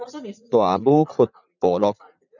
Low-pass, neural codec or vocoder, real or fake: 7.2 kHz; vocoder, 44.1 kHz, 80 mel bands, Vocos; fake